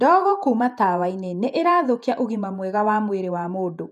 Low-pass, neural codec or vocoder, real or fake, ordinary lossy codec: 14.4 kHz; vocoder, 48 kHz, 128 mel bands, Vocos; fake; none